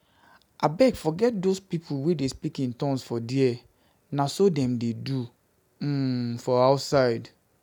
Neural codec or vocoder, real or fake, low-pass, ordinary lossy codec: none; real; none; none